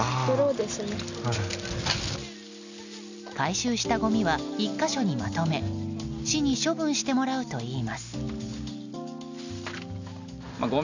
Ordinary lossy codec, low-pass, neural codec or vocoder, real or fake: none; 7.2 kHz; none; real